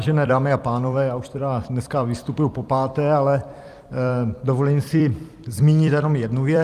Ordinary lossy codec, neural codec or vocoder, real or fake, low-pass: Opus, 32 kbps; vocoder, 44.1 kHz, 128 mel bands every 256 samples, BigVGAN v2; fake; 14.4 kHz